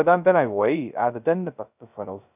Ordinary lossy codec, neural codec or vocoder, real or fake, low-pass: none; codec, 16 kHz, 0.2 kbps, FocalCodec; fake; 3.6 kHz